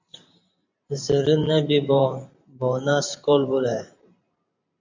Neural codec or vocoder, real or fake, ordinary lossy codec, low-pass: vocoder, 44.1 kHz, 128 mel bands every 512 samples, BigVGAN v2; fake; MP3, 64 kbps; 7.2 kHz